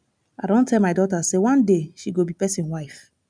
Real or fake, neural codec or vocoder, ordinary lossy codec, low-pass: real; none; none; 9.9 kHz